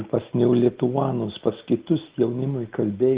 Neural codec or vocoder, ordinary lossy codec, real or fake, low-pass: none; Opus, 16 kbps; real; 3.6 kHz